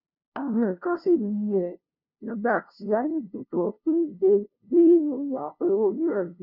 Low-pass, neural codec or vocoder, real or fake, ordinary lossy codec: 5.4 kHz; codec, 16 kHz, 0.5 kbps, FunCodec, trained on LibriTTS, 25 frames a second; fake; none